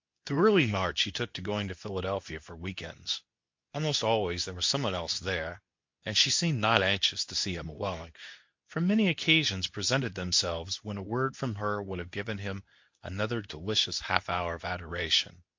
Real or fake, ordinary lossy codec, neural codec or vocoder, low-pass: fake; MP3, 48 kbps; codec, 24 kHz, 0.9 kbps, WavTokenizer, medium speech release version 2; 7.2 kHz